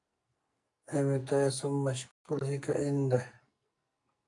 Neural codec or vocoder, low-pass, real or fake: codec, 44.1 kHz, 2.6 kbps, SNAC; 10.8 kHz; fake